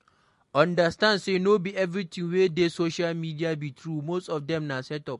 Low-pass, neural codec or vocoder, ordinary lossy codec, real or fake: 14.4 kHz; none; MP3, 48 kbps; real